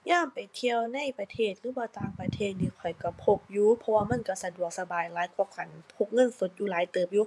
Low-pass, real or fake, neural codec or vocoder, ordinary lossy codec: none; fake; vocoder, 24 kHz, 100 mel bands, Vocos; none